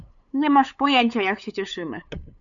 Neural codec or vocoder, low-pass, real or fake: codec, 16 kHz, 8 kbps, FunCodec, trained on LibriTTS, 25 frames a second; 7.2 kHz; fake